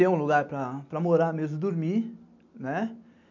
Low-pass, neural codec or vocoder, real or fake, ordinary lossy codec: 7.2 kHz; none; real; MP3, 64 kbps